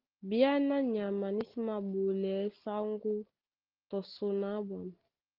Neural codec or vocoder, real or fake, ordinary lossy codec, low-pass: none; real; Opus, 16 kbps; 5.4 kHz